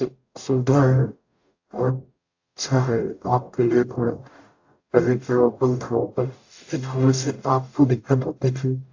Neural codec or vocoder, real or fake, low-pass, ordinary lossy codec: codec, 44.1 kHz, 0.9 kbps, DAC; fake; 7.2 kHz; AAC, 48 kbps